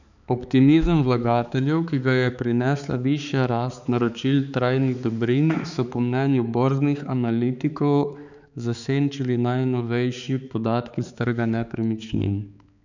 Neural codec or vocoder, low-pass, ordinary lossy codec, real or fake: codec, 16 kHz, 4 kbps, X-Codec, HuBERT features, trained on balanced general audio; 7.2 kHz; none; fake